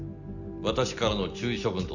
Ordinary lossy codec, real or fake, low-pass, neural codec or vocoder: none; real; 7.2 kHz; none